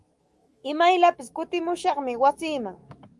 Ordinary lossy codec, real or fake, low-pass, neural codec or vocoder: Opus, 32 kbps; fake; 10.8 kHz; autoencoder, 48 kHz, 128 numbers a frame, DAC-VAE, trained on Japanese speech